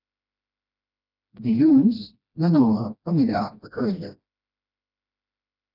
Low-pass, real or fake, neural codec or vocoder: 5.4 kHz; fake; codec, 16 kHz, 1 kbps, FreqCodec, smaller model